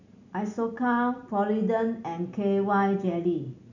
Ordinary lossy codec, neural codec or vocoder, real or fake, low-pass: none; none; real; 7.2 kHz